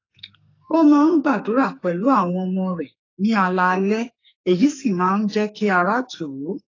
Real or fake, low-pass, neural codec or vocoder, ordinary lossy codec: fake; 7.2 kHz; codec, 44.1 kHz, 2.6 kbps, SNAC; AAC, 32 kbps